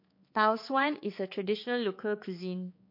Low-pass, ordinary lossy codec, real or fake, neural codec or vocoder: 5.4 kHz; MP3, 32 kbps; fake; codec, 16 kHz, 4 kbps, X-Codec, HuBERT features, trained on balanced general audio